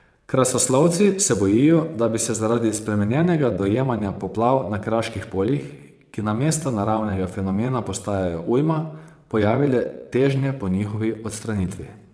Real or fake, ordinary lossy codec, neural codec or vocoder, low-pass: fake; none; vocoder, 22.05 kHz, 80 mel bands, WaveNeXt; none